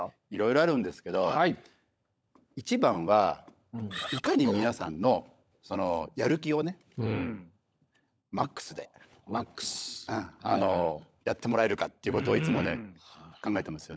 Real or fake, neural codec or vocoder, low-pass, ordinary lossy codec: fake; codec, 16 kHz, 16 kbps, FunCodec, trained on LibriTTS, 50 frames a second; none; none